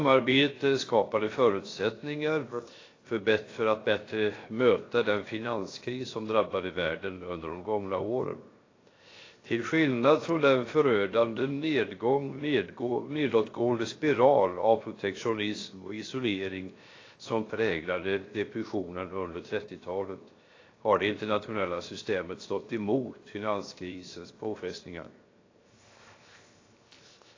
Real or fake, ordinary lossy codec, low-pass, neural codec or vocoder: fake; AAC, 32 kbps; 7.2 kHz; codec, 16 kHz, 0.7 kbps, FocalCodec